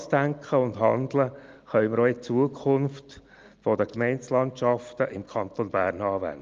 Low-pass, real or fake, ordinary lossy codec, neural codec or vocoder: 7.2 kHz; real; Opus, 32 kbps; none